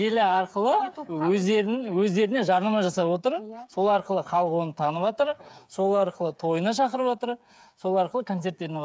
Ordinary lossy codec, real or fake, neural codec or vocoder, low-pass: none; fake; codec, 16 kHz, 8 kbps, FreqCodec, smaller model; none